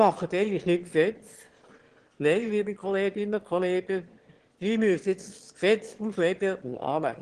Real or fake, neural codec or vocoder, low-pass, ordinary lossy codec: fake; autoencoder, 22.05 kHz, a latent of 192 numbers a frame, VITS, trained on one speaker; 9.9 kHz; Opus, 16 kbps